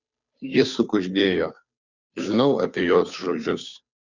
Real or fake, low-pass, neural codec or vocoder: fake; 7.2 kHz; codec, 16 kHz, 2 kbps, FunCodec, trained on Chinese and English, 25 frames a second